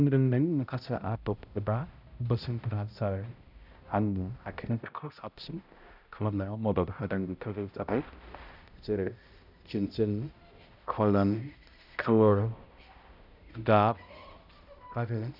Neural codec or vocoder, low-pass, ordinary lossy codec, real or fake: codec, 16 kHz, 0.5 kbps, X-Codec, HuBERT features, trained on balanced general audio; 5.4 kHz; none; fake